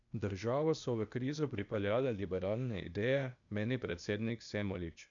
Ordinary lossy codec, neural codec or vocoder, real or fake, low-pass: none; codec, 16 kHz, 0.8 kbps, ZipCodec; fake; 7.2 kHz